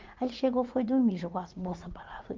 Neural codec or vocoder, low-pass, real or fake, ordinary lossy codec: vocoder, 44.1 kHz, 80 mel bands, Vocos; 7.2 kHz; fake; Opus, 24 kbps